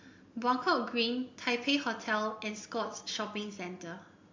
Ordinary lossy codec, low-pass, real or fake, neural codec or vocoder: MP3, 48 kbps; 7.2 kHz; real; none